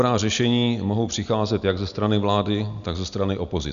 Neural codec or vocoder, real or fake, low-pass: none; real; 7.2 kHz